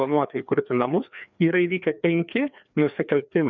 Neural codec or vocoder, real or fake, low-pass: codec, 16 kHz, 2 kbps, FreqCodec, larger model; fake; 7.2 kHz